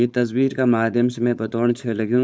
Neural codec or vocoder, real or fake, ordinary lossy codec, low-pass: codec, 16 kHz, 4.8 kbps, FACodec; fake; none; none